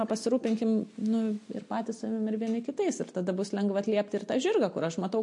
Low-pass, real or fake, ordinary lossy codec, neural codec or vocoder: 10.8 kHz; real; MP3, 48 kbps; none